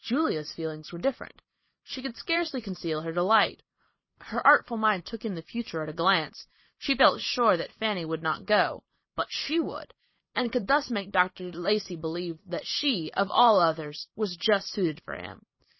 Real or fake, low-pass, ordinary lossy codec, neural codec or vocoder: fake; 7.2 kHz; MP3, 24 kbps; vocoder, 44.1 kHz, 128 mel bands every 512 samples, BigVGAN v2